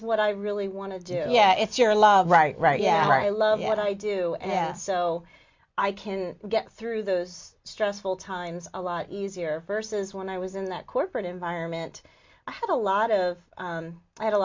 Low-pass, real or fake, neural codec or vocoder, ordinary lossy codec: 7.2 kHz; real; none; MP3, 48 kbps